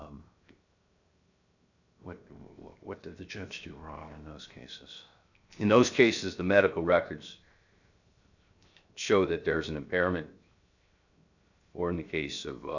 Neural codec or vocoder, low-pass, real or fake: codec, 16 kHz, 0.7 kbps, FocalCodec; 7.2 kHz; fake